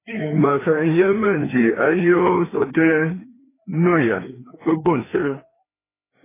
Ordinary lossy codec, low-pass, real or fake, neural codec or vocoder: AAC, 16 kbps; 3.6 kHz; fake; codec, 16 kHz, 2 kbps, FreqCodec, larger model